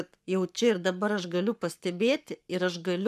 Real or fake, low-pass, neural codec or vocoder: fake; 14.4 kHz; codec, 44.1 kHz, 7.8 kbps, Pupu-Codec